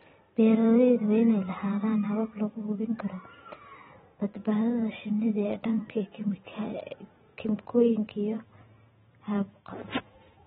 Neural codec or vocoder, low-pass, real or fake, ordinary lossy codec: vocoder, 44.1 kHz, 128 mel bands every 512 samples, BigVGAN v2; 19.8 kHz; fake; AAC, 16 kbps